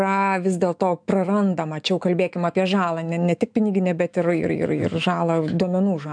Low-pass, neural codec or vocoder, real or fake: 9.9 kHz; autoencoder, 48 kHz, 128 numbers a frame, DAC-VAE, trained on Japanese speech; fake